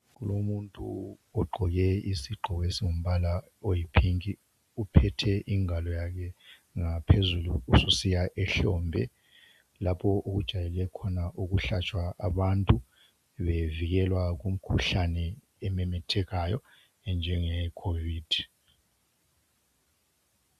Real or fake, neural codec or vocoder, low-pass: real; none; 14.4 kHz